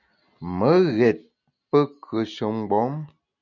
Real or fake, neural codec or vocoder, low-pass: real; none; 7.2 kHz